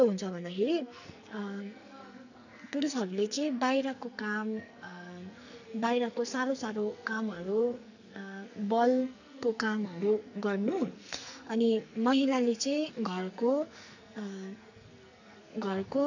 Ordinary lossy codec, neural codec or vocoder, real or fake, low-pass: none; codec, 44.1 kHz, 2.6 kbps, SNAC; fake; 7.2 kHz